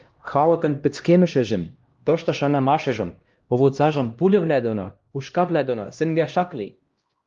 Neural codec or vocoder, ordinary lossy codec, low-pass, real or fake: codec, 16 kHz, 1 kbps, X-Codec, HuBERT features, trained on LibriSpeech; Opus, 32 kbps; 7.2 kHz; fake